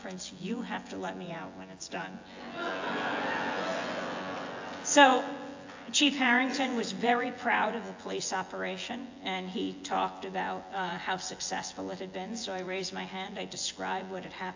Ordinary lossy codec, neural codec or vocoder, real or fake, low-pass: AAC, 48 kbps; vocoder, 24 kHz, 100 mel bands, Vocos; fake; 7.2 kHz